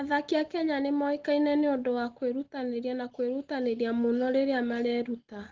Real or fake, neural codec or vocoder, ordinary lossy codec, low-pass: real; none; Opus, 16 kbps; 7.2 kHz